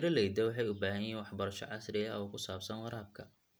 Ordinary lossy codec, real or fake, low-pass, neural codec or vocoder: none; real; none; none